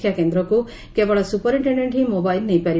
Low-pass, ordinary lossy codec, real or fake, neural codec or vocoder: none; none; real; none